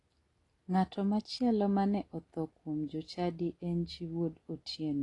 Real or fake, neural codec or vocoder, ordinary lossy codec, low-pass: real; none; AAC, 32 kbps; 10.8 kHz